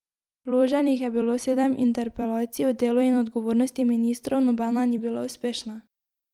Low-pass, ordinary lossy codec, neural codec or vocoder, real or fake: 19.8 kHz; Opus, 32 kbps; vocoder, 44.1 kHz, 128 mel bands every 256 samples, BigVGAN v2; fake